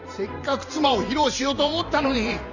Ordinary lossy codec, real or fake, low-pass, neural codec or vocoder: none; fake; 7.2 kHz; vocoder, 44.1 kHz, 128 mel bands every 512 samples, BigVGAN v2